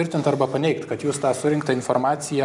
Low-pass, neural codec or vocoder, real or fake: 10.8 kHz; none; real